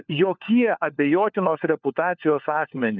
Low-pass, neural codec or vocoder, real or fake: 7.2 kHz; codec, 16 kHz, 4 kbps, FunCodec, trained on LibriTTS, 50 frames a second; fake